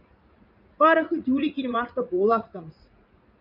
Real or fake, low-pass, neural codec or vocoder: fake; 5.4 kHz; vocoder, 44.1 kHz, 80 mel bands, Vocos